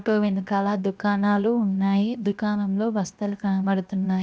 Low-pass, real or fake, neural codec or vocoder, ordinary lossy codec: none; fake; codec, 16 kHz, 0.7 kbps, FocalCodec; none